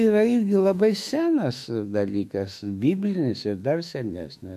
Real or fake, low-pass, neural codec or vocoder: fake; 14.4 kHz; autoencoder, 48 kHz, 32 numbers a frame, DAC-VAE, trained on Japanese speech